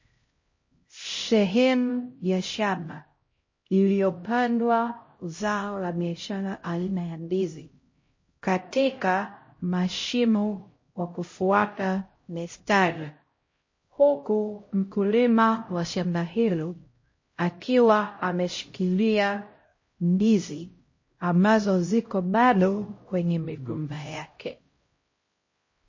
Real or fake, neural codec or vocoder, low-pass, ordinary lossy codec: fake; codec, 16 kHz, 0.5 kbps, X-Codec, HuBERT features, trained on LibriSpeech; 7.2 kHz; MP3, 32 kbps